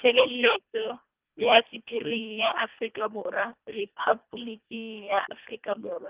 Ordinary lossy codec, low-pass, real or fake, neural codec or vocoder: Opus, 32 kbps; 3.6 kHz; fake; codec, 24 kHz, 1.5 kbps, HILCodec